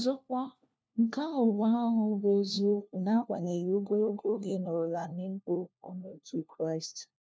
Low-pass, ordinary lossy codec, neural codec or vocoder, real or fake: none; none; codec, 16 kHz, 1 kbps, FunCodec, trained on LibriTTS, 50 frames a second; fake